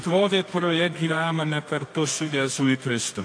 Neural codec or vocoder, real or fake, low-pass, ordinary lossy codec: codec, 24 kHz, 0.9 kbps, WavTokenizer, medium music audio release; fake; 9.9 kHz; AAC, 48 kbps